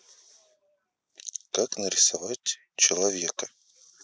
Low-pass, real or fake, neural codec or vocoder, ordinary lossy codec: none; real; none; none